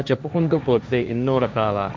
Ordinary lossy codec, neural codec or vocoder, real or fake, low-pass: none; codec, 16 kHz, 1.1 kbps, Voila-Tokenizer; fake; none